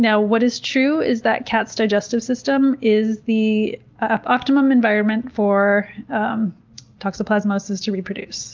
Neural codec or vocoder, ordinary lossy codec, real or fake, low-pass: none; Opus, 32 kbps; real; 7.2 kHz